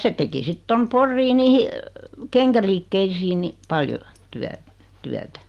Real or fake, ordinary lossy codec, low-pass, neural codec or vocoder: real; Opus, 24 kbps; 14.4 kHz; none